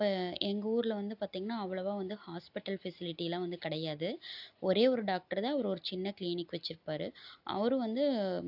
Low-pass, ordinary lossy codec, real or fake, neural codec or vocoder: 5.4 kHz; AAC, 48 kbps; real; none